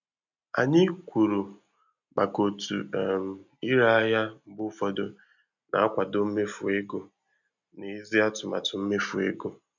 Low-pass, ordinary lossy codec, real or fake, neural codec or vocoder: 7.2 kHz; none; real; none